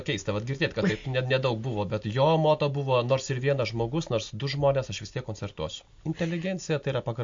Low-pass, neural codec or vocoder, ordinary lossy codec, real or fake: 7.2 kHz; none; MP3, 48 kbps; real